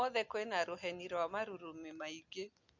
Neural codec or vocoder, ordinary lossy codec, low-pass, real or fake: none; none; 7.2 kHz; real